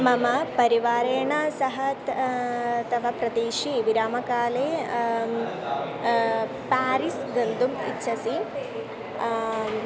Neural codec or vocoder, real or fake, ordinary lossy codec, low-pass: none; real; none; none